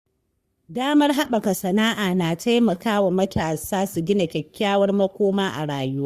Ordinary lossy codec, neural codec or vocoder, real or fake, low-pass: Opus, 64 kbps; codec, 44.1 kHz, 3.4 kbps, Pupu-Codec; fake; 14.4 kHz